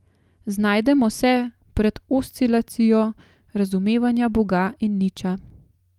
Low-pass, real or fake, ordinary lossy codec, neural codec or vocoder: 19.8 kHz; real; Opus, 32 kbps; none